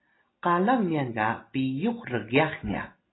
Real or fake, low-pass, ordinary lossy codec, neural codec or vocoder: real; 7.2 kHz; AAC, 16 kbps; none